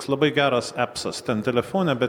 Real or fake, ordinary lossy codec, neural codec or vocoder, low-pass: real; MP3, 96 kbps; none; 19.8 kHz